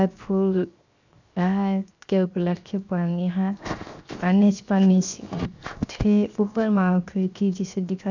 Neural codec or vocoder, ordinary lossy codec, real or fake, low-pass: codec, 16 kHz, 0.7 kbps, FocalCodec; none; fake; 7.2 kHz